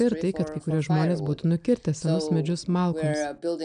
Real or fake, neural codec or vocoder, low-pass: real; none; 9.9 kHz